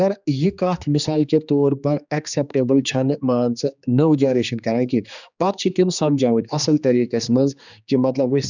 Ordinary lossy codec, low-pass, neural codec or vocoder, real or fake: none; 7.2 kHz; codec, 16 kHz, 2 kbps, X-Codec, HuBERT features, trained on general audio; fake